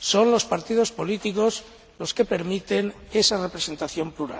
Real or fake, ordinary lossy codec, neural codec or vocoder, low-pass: real; none; none; none